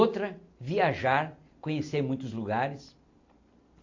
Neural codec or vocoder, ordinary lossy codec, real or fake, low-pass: none; AAC, 48 kbps; real; 7.2 kHz